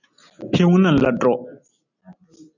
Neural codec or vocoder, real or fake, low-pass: none; real; 7.2 kHz